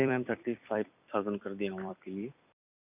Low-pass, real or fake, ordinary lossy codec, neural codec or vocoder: 3.6 kHz; fake; none; vocoder, 44.1 kHz, 128 mel bands every 256 samples, BigVGAN v2